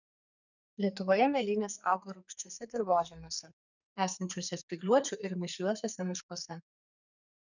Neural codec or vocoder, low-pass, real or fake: codec, 44.1 kHz, 2.6 kbps, SNAC; 7.2 kHz; fake